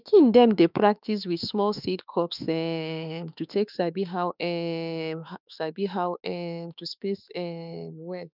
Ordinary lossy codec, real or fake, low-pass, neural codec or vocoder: none; fake; 5.4 kHz; autoencoder, 48 kHz, 32 numbers a frame, DAC-VAE, trained on Japanese speech